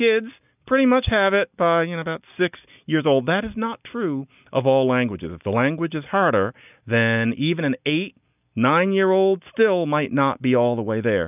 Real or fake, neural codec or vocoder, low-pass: real; none; 3.6 kHz